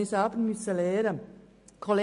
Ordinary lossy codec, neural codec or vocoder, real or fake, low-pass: MP3, 48 kbps; none; real; 10.8 kHz